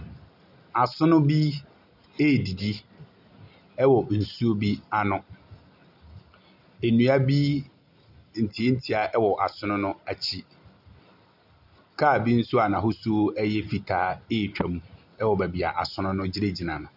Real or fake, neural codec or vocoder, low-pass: real; none; 5.4 kHz